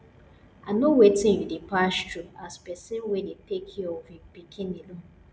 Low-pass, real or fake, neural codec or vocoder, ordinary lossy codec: none; real; none; none